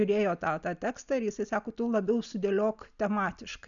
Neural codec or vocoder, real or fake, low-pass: none; real; 7.2 kHz